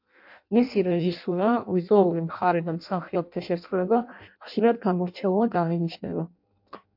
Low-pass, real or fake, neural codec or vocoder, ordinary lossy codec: 5.4 kHz; fake; codec, 16 kHz in and 24 kHz out, 0.6 kbps, FireRedTTS-2 codec; MP3, 48 kbps